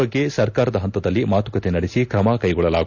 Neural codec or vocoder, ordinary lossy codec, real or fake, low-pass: none; none; real; 7.2 kHz